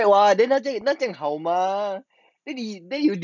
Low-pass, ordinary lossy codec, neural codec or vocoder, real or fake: 7.2 kHz; none; none; real